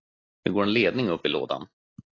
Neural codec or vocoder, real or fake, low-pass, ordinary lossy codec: none; real; 7.2 kHz; AAC, 32 kbps